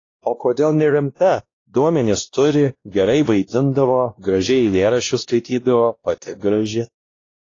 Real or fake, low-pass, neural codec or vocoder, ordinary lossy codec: fake; 7.2 kHz; codec, 16 kHz, 1 kbps, X-Codec, WavLM features, trained on Multilingual LibriSpeech; AAC, 32 kbps